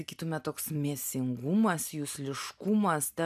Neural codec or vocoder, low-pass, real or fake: none; 14.4 kHz; real